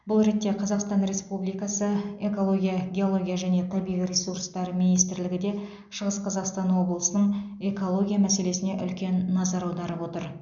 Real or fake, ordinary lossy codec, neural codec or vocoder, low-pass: real; none; none; 7.2 kHz